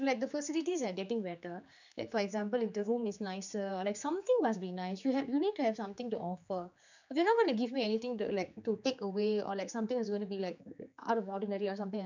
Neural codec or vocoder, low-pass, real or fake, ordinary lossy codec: codec, 16 kHz, 4 kbps, X-Codec, HuBERT features, trained on general audio; 7.2 kHz; fake; none